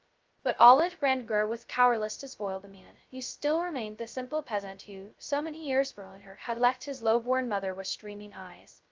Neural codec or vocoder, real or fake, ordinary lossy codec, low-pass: codec, 16 kHz, 0.2 kbps, FocalCodec; fake; Opus, 24 kbps; 7.2 kHz